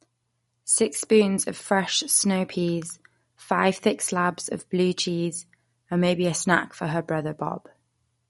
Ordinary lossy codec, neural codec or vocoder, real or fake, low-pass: MP3, 48 kbps; none; real; 19.8 kHz